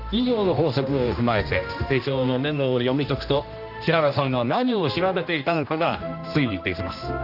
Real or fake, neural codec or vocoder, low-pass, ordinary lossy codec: fake; codec, 16 kHz, 1 kbps, X-Codec, HuBERT features, trained on general audio; 5.4 kHz; none